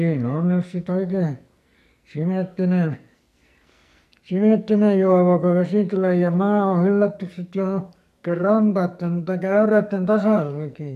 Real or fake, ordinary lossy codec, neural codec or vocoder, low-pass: fake; none; codec, 44.1 kHz, 2.6 kbps, SNAC; 14.4 kHz